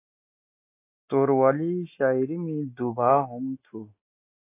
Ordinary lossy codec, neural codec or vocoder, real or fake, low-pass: AAC, 24 kbps; none; real; 3.6 kHz